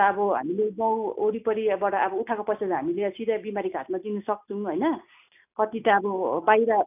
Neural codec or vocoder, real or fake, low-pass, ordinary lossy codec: none; real; 3.6 kHz; none